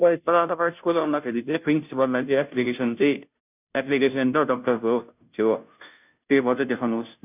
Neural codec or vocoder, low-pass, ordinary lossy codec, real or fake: codec, 16 kHz, 0.5 kbps, FunCodec, trained on Chinese and English, 25 frames a second; 3.6 kHz; none; fake